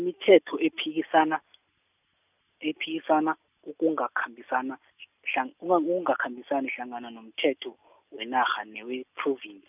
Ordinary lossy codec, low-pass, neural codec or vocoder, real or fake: none; 3.6 kHz; none; real